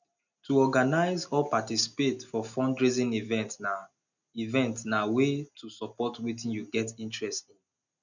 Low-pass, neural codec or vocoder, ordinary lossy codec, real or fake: 7.2 kHz; none; none; real